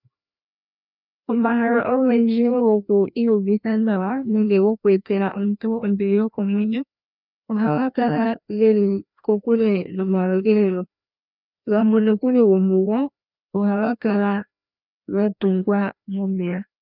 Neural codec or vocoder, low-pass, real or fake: codec, 16 kHz, 1 kbps, FreqCodec, larger model; 5.4 kHz; fake